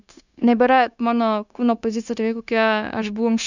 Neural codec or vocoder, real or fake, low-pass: codec, 16 kHz, 0.9 kbps, LongCat-Audio-Codec; fake; 7.2 kHz